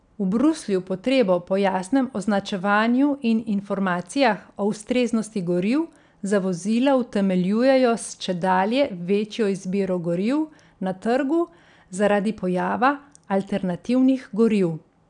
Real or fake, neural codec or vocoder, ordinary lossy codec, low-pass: real; none; none; 9.9 kHz